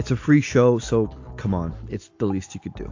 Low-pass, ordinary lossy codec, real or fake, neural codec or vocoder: 7.2 kHz; AAC, 48 kbps; real; none